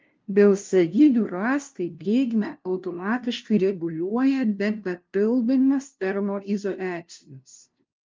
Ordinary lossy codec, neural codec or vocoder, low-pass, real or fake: Opus, 32 kbps; codec, 16 kHz, 0.5 kbps, FunCodec, trained on LibriTTS, 25 frames a second; 7.2 kHz; fake